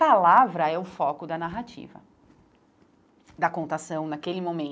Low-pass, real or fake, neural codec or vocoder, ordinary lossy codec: none; real; none; none